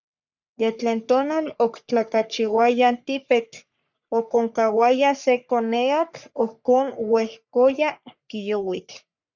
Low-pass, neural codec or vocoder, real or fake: 7.2 kHz; codec, 44.1 kHz, 3.4 kbps, Pupu-Codec; fake